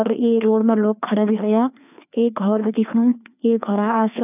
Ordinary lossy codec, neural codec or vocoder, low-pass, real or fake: none; codec, 16 kHz, 2 kbps, FreqCodec, larger model; 3.6 kHz; fake